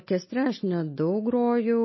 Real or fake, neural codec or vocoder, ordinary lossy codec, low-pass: real; none; MP3, 24 kbps; 7.2 kHz